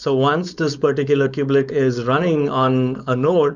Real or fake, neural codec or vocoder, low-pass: fake; codec, 16 kHz, 4.8 kbps, FACodec; 7.2 kHz